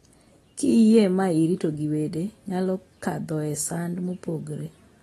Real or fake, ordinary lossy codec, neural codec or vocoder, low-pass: real; AAC, 32 kbps; none; 19.8 kHz